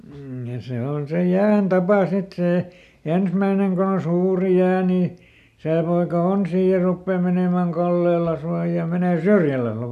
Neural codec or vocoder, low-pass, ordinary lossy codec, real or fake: none; 14.4 kHz; none; real